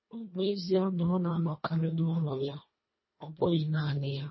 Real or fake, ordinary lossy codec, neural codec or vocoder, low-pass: fake; MP3, 24 kbps; codec, 24 kHz, 1.5 kbps, HILCodec; 7.2 kHz